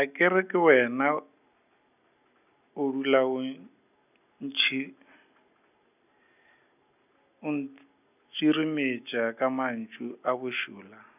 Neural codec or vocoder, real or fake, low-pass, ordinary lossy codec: none; real; 3.6 kHz; none